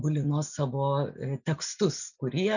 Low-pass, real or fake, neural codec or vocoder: 7.2 kHz; real; none